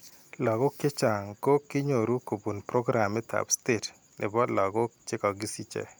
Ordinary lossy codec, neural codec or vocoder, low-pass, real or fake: none; none; none; real